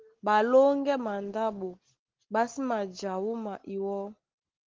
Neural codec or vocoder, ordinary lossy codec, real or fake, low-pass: none; Opus, 16 kbps; real; 7.2 kHz